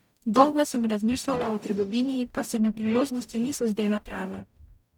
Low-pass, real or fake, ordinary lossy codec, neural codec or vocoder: 19.8 kHz; fake; none; codec, 44.1 kHz, 0.9 kbps, DAC